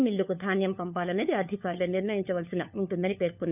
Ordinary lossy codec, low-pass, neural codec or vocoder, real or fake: none; 3.6 kHz; codec, 16 kHz, 4 kbps, FunCodec, trained on LibriTTS, 50 frames a second; fake